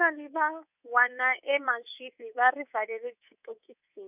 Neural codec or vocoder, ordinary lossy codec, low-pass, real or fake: codec, 24 kHz, 3.1 kbps, DualCodec; none; 3.6 kHz; fake